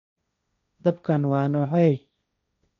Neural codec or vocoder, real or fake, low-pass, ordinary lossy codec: codec, 16 kHz, 1.1 kbps, Voila-Tokenizer; fake; 7.2 kHz; none